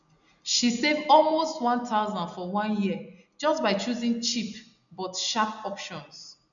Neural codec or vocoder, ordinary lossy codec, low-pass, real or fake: none; none; 7.2 kHz; real